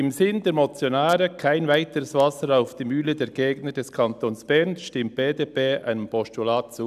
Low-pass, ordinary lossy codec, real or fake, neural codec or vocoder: 14.4 kHz; none; real; none